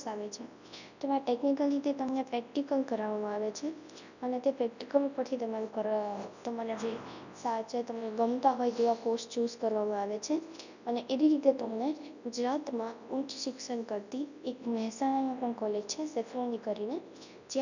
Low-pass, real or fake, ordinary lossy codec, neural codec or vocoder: 7.2 kHz; fake; none; codec, 24 kHz, 0.9 kbps, WavTokenizer, large speech release